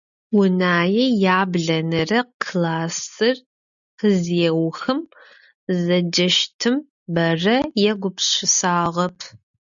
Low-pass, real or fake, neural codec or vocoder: 7.2 kHz; real; none